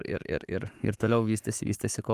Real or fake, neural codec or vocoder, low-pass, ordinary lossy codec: fake; codec, 44.1 kHz, 7.8 kbps, Pupu-Codec; 14.4 kHz; Opus, 32 kbps